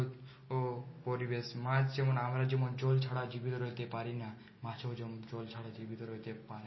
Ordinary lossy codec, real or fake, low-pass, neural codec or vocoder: MP3, 24 kbps; real; 7.2 kHz; none